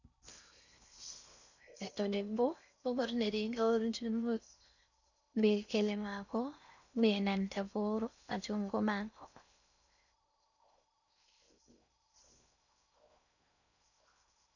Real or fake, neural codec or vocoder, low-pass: fake; codec, 16 kHz in and 24 kHz out, 0.6 kbps, FocalCodec, streaming, 4096 codes; 7.2 kHz